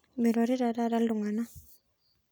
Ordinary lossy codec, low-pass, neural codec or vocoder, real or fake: none; none; none; real